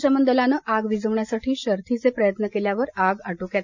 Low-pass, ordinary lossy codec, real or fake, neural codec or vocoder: 7.2 kHz; none; real; none